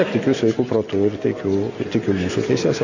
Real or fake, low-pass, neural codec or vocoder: real; 7.2 kHz; none